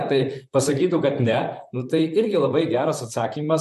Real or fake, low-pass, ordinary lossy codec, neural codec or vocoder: fake; 14.4 kHz; MP3, 96 kbps; vocoder, 44.1 kHz, 128 mel bands, Pupu-Vocoder